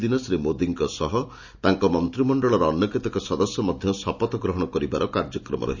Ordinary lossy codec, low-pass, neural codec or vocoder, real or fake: none; 7.2 kHz; none; real